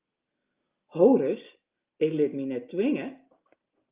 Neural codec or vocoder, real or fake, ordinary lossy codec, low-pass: none; real; Opus, 24 kbps; 3.6 kHz